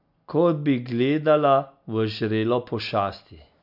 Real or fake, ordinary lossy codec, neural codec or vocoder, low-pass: real; MP3, 48 kbps; none; 5.4 kHz